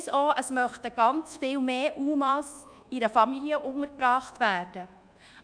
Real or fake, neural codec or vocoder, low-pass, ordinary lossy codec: fake; codec, 24 kHz, 1.2 kbps, DualCodec; 9.9 kHz; none